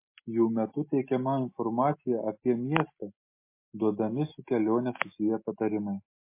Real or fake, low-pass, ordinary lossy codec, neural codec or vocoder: real; 3.6 kHz; MP3, 24 kbps; none